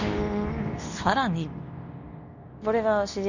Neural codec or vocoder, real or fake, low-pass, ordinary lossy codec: codec, 24 kHz, 0.9 kbps, WavTokenizer, medium speech release version 2; fake; 7.2 kHz; none